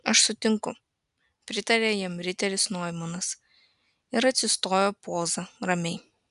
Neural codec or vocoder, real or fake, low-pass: none; real; 10.8 kHz